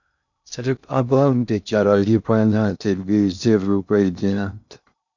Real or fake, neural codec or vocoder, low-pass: fake; codec, 16 kHz in and 24 kHz out, 0.6 kbps, FocalCodec, streaming, 2048 codes; 7.2 kHz